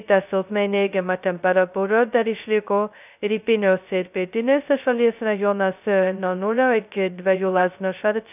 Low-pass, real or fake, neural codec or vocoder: 3.6 kHz; fake; codec, 16 kHz, 0.2 kbps, FocalCodec